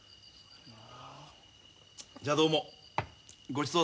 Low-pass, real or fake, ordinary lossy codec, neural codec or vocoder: none; real; none; none